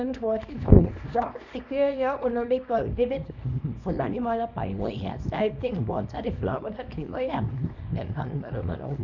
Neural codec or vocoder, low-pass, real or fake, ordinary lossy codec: codec, 24 kHz, 0.9 kbps, WavTokenizer, small release; 7.2 kHz; fake; none